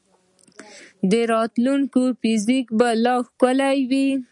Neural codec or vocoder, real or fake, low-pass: none; real; 10.8 kHz